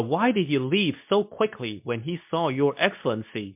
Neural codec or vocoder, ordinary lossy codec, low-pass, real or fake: codec, 24 kHz, 0.9 kbps, WavTokenizer, medium speech release version 2; MP3, 32 kbps; 3.6 kHz; fake